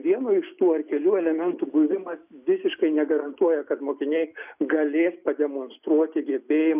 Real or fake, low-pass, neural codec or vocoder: real; 3.6 kHz; none